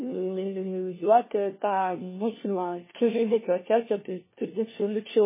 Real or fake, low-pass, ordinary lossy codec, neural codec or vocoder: fake; 3.6 kHz; MP3, 16 kbps; codec, 16 kHz, 1 kbps, FunCodec, trained on LibriTTS, 50 frames a second